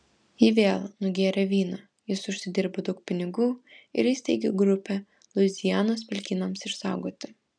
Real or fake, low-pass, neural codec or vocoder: real; 9.9 kHz; none